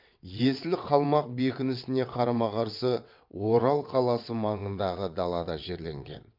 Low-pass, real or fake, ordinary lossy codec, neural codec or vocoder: 5.4 kHz; fake; MP3, 48 kbps; vocoder, 22.05 kHz, 80 mel bands, WaveNeXt